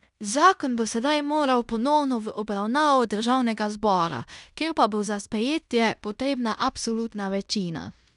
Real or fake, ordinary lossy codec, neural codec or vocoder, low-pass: fake; none; codec, 16 kHz in and 24 kHz out, 0.9 kbps, LongCat-Audio-Codec, fine tuned four codebook decoder; 10.8 kHz